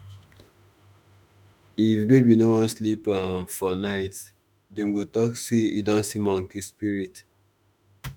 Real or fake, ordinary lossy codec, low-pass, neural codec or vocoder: fake; none; none; autoencoder, 48 kHz, 32 numbers a frame, DAC-VAE, trained on Japanese speech